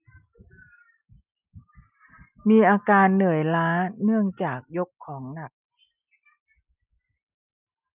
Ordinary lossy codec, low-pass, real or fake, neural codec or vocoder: none; 3.6 kHz; real; none